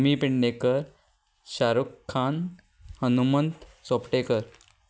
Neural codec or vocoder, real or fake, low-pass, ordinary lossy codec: none; real; none; none